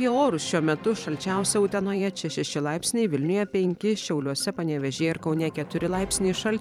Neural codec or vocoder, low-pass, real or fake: vocoder, 44.1 kHz, 128 mel bands every 512 samples, BigVGAN v2; 19.8 kHz; fake